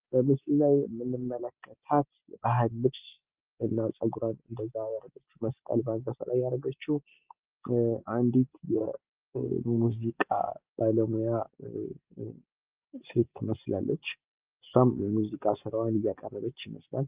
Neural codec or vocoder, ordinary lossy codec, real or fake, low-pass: none; Opus, 24 kbps; real; 3.6 kHz